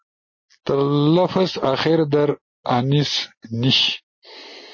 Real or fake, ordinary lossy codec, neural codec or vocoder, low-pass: real; MP3, 32 kbps; none; 7.2 kHz